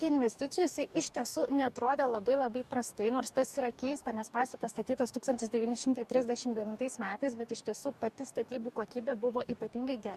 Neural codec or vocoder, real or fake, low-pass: codec, 44.1 kHz, 2.6 kbps, DAC; fake; 14.4 kHz